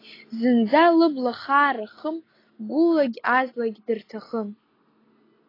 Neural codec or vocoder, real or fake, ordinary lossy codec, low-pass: none; real; AAC, 24 kbps; 5.4 kHz